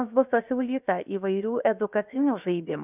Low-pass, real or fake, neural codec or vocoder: 3.6 kHz; fake; codec, 16 kHz, 0.7 kbps, FocalCodec